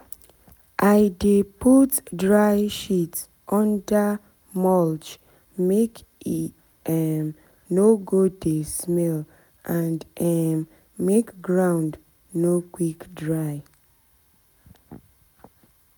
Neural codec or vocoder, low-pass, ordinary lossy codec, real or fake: none; none; none; real